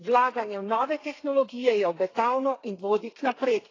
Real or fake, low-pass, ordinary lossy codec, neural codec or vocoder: fake; 7.2 kHz; AAC, 32 kbps; codec, 32 kHz, 1.9 kbps, SNAC